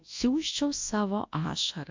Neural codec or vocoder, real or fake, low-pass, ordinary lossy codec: codec, 24 kHz, 0.9 kbps, WavTokenizer, large speech release; fake; 7.2 kHz; MP3, 48 kbps